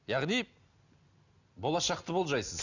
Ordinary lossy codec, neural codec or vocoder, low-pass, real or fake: none; none; 7.2 kHz; real